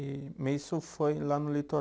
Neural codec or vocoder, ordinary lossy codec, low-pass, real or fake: none; none; none; real